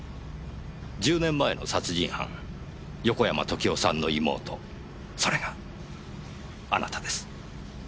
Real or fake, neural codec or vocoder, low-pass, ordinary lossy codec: real; none; none; none